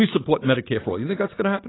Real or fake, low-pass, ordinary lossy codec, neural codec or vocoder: real; 7.2 kHz; AAC, 16 kbps; none